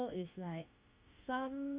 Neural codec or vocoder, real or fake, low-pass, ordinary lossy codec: autoencoder, 48 kHz, 32 numbers a frame, DAC-VAE, trained on Japanese speech; fake; 3.6 kHz; Opus, 64 kbps